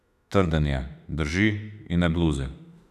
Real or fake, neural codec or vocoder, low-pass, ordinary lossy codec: fake; autoencoder, 48 kHz, 32 numbers a frame, DAC-VAE, trained on Japanese speech; 14.4 kHz; none